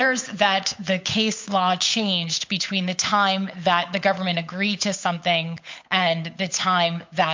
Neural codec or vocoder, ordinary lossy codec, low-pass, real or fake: codec, 16 kHz, 8 kbps, FunCodec, trained on Chinese and English, 25 frames a second; MP3, 48 kbps; 7.2 kHz; fake